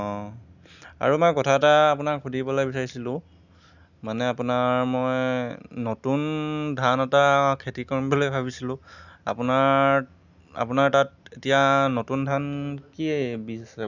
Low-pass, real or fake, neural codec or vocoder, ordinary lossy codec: 7.2 kHz; real; none; none